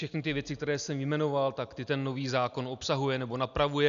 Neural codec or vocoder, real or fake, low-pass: none; real; 7.2 kHz